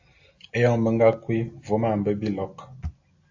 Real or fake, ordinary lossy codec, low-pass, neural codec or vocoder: real; AAC, 48 kbps; 7.2 kHz; none